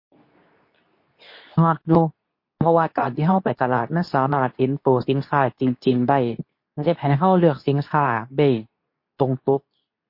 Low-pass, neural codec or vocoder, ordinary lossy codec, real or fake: 5.4 kHz; codec, 24 kHz, 0.9 kbps, WavTokenizer, medium speech release version 2; MP3, 32 kbps; fake